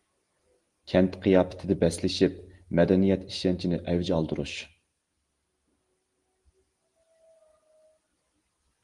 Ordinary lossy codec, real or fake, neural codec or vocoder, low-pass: Opus, 24 kbps; real; none; 10.8 kHz